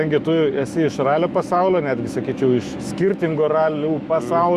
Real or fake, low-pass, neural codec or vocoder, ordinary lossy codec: fake; 14.4 kHz; autoencoder, 48 kHz, 128 numbers a frame, DAC-VAE, trained on Japanese speech; Opus, 64 kbps